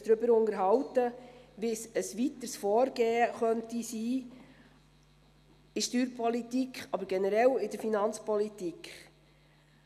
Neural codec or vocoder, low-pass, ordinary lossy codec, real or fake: none; 14.4 kHz; none; real